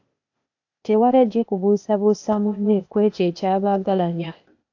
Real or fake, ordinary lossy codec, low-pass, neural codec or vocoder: fake; AAC, 48 kbps; 7.2 kHz; codec, 16 kHz, 0.8 kbps, ZipCodec